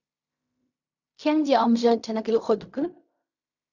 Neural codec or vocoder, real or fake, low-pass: codec, 16 kHz in and 24 kHz out, 0.4 kbps, LongCat-Audio-Codec, fine tuned four codebook decoder; fake; 7.2 kHz